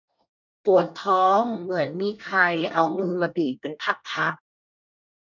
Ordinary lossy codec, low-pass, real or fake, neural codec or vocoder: none; 7.2 kHz; fake; codec, 24 kHz, 1 kbps, SNAC